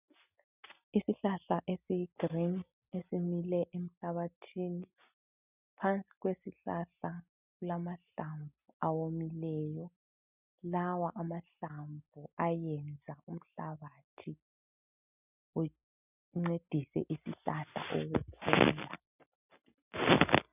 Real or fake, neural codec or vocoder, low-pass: real; none; 3.6 kHz